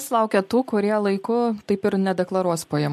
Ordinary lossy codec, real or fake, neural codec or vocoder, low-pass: MP3, 64 kbps; real; none; 14.4 kHz